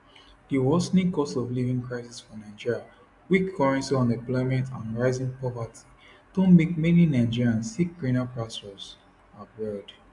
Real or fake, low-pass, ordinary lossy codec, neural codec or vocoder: real; 10.8 kHz; AAC, 64 kbps; none